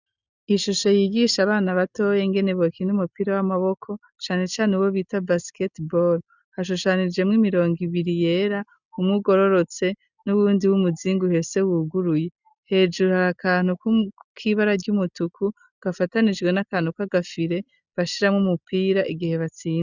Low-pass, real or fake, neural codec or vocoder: 7.2 kHz; real; none